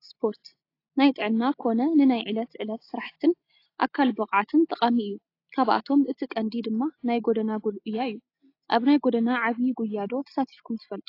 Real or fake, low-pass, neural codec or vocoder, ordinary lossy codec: real; 5.4 kHz; none; AAC, 32 kbps